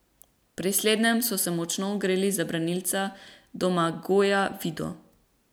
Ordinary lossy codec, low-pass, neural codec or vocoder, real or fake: none; none; none; real